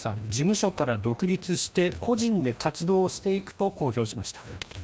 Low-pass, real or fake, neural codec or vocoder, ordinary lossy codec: none; fake; codec, 16 kHz, 1 kbps, FreqCodec, larger model; none